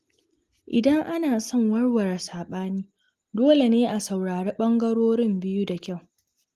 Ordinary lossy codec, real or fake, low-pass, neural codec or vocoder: Opus, 24 kbps; real; 14.4 kHz; none